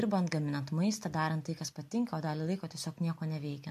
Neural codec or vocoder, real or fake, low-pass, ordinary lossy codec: none; real; 14.4 kHz; MP3, 96 kbps